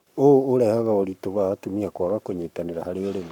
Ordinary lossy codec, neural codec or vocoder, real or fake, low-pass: none; codec, 44.1 kHz, 7.8 kbps, Pupu-Codec; fake; 19.8 kHz